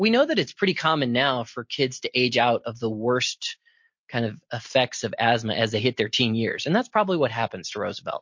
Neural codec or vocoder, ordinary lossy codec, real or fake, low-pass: none; MP3, 48 kbps; real; 7.2 kHz